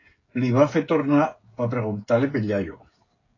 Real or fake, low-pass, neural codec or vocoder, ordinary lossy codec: fake; 7.2 kHz; codec, 16 kHz, 16 kbps, FreqCodec, smaller model; AAC, 32 kbps